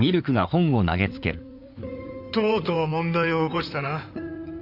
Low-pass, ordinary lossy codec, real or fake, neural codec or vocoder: 5.4 kHz; none; fake; codec, 16 kHz in and 24 kHz out, 2.2 kbps, FireRedTTS-2 codec